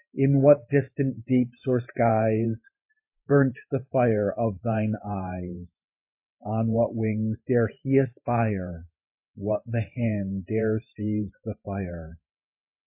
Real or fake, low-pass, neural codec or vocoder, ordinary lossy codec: fake; 3.6 kHz; vocoder, 44.1 kHz, 128 mel bands every 512 samples, BigVGAN v2; MP3, 24 kbps